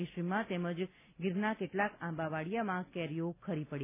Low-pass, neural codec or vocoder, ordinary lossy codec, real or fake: 3.6 kHz; none; none; real